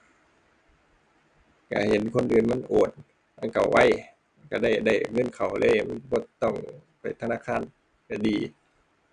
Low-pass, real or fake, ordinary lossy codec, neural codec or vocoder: 9.9 kHz; real; none; none